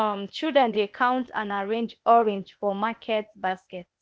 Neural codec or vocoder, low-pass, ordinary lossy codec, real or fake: codec, 16 kHz, 0.8 kbps, ZipCodec; none; none; fake